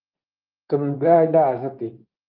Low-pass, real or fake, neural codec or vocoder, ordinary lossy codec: 5.4 kHz; fake; codec, 24 kHz, 0.9 kbps, WavTokenizer, medium speech release version 2; Opus, 24 kbps